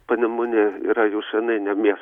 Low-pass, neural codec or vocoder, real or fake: 19.8 kHz; none; real